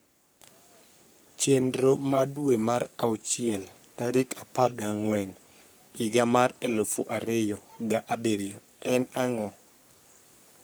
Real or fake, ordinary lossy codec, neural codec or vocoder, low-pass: fake; none; codec, 44.1 kHz, 3.4 kbps, Pupu-Codec; none